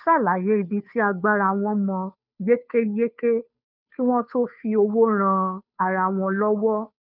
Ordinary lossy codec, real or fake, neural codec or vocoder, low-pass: none; fake; codec, 16 kHz, 2 kbps, FunCodec, trained on Chinese and English, 25 frames a second; 5.4 kHz